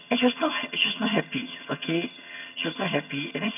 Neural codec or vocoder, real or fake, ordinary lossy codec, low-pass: vocoder, 22.05 kHz, 80 mel bands, HiFi-GAN; fake; none; 3.6 kHz